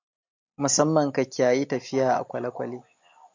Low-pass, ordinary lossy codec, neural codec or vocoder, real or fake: 7.2 kHz; MP3, 48 kbps; none; real